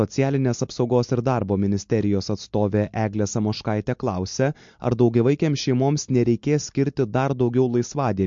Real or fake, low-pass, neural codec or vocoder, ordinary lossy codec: real; 7.2 kHz; none; MP3, 48 kbps